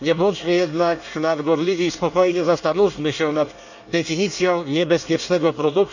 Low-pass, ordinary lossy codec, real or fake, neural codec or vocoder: 7.2 kHz; none; fake; codec, 24 kHz, 1 kbps, SNAC